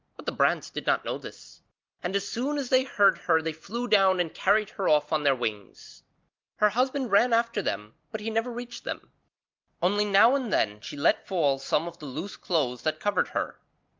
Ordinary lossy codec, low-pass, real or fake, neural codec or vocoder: Opus, 24 kbps; 7.2 kHz; real; none